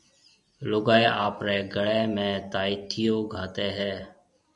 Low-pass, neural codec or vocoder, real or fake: 10.8 kHz; none; real